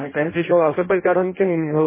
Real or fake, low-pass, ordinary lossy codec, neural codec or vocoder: fake; 3.6 kHz; MP3, 16 kbps; codec, 16 kHz in and 24 kHz out, 0.6 kbps, FireRedTTS-2 codec